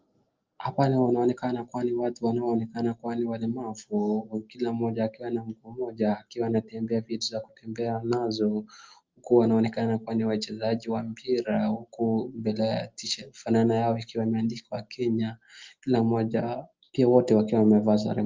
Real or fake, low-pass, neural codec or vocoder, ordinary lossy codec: real; 7.2 kHz; none; Opus, 32 kbps